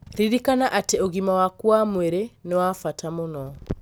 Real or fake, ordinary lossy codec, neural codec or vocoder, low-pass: real; none; none; none